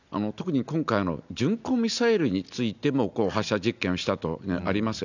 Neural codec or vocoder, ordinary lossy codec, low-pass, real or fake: none; none; 7.2 kHz; real